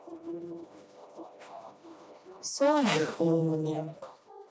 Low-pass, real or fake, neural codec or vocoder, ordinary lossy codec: none; fake; codec, 16 kHz, 1 kbps, FreqCodec, smaller model; none